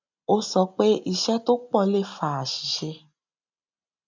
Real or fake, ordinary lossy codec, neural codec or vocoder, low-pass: real; MP3, 64 kbps; none; 7.2 kHz